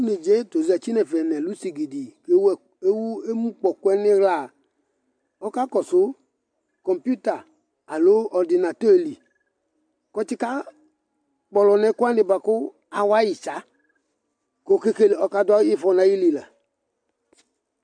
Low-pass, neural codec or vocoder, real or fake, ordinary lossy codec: 9.9 kHz; none; real; MP3, 64 kbps